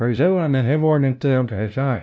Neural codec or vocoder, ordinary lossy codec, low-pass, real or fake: codec, 16 kHz, 0.5 kbps, FunCodec, trained on LibriTTS, 25 frames a second; none; none; fake